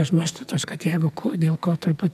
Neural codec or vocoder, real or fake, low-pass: codec, 44.1 kHz, 2.6 kbps, SNAC; fake; 14.4 kHz